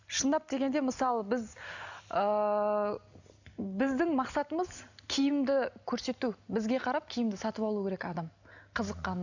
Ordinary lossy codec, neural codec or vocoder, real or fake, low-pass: none; none; real; 7.2 kHz